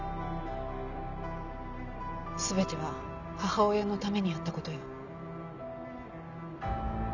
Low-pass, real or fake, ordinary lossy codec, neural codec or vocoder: 7.2 kHz; real; none; none